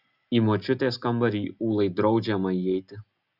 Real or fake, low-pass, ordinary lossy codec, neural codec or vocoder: real; 5.4 kHz; Opus, 64 kbps; none